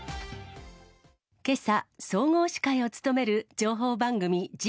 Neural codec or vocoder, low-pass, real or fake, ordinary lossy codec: none; none; real; none